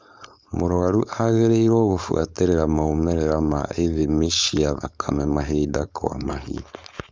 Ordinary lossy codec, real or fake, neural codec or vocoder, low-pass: none; fake; codec, 16 kHz, 4.8 kbps, FACodec; none